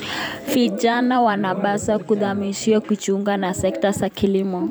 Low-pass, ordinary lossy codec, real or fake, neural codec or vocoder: none; none; fake; vocoder, 44.1 kHz, 128 mel bands every 512 samples, BigVGAN v2